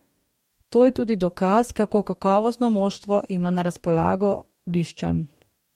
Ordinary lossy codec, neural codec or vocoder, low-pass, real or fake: MP3, 64 kbps; codec, 44.1 kHz, 2.6 kbps, DAC; 19.8 kHz; fake